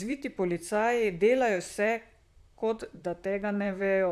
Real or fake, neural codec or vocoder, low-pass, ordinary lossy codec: fake; vocoder, 44.1 kHz, 128 mel bands, Pupu-Vocoder; 14.4 kHz; none